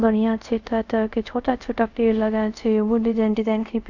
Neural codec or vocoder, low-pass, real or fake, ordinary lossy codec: codec, 24 kHz, 0.5 kbps, DualCodec; 7.2 kHz; fake; none